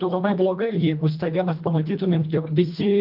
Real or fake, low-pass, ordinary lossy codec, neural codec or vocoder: fake; 5.4 kHz; Opus, 32 kbps; codec, 24 kHz, 1.5 kbps, HILCodec